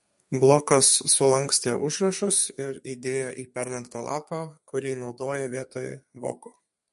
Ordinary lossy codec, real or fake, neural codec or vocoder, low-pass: MP3, 48 kbps; fake; codec, 32 kHz, 1.9 kbps, SNAC; 14.4 kHz